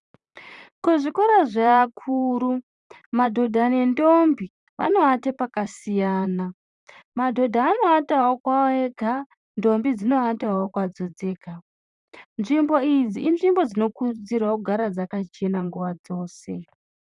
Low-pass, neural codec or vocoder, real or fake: 10.8 kHz; vocoder, 44.1 kHz, 128 mel bands, Pupu-Vocoder; fake